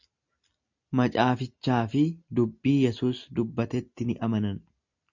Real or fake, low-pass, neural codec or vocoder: real; 7.2 kHz; none